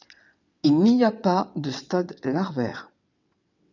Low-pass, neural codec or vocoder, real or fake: 7.2 kHz; vocoder, 22.05 kHz, 80 mel bands, WaveNeXt; fake